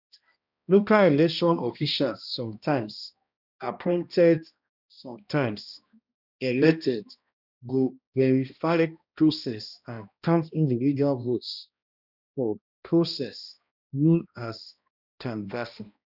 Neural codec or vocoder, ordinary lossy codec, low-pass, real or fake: codec, 16 kHz, 1 kbps, X-Codec, HuBERT features, trained on balanced general audio; none; 5.4 kHz; fake